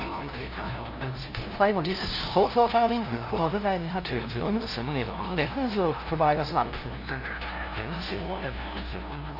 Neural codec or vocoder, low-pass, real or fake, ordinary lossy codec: codec, 16 kHz, 0.5 kbps, FunCodec, trained on LibriTTS, 25 frames a second; 5.4 kHz; fake; none